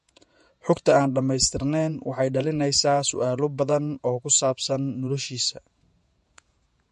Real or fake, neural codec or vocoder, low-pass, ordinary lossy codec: fake; vocoder, 48 kHz, 128 mel bands, Vocos; 14.4 kHz; MP3, 48 kbps